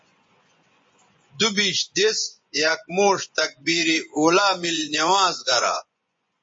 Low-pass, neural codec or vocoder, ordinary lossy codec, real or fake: 9.9 kHz; vocoder, 24 kHz, 100 mel bands, Vocos; MP3, 32 kbps; fake